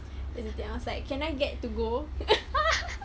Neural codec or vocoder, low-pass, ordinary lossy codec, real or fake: none; none; none; real